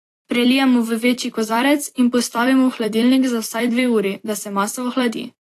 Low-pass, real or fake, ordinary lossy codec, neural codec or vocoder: 14.4 kHz; fake; AAC, 48 kbps; vocoder, 44.1 kHz, 128 mel bands every 256 samples, BigVGAN v2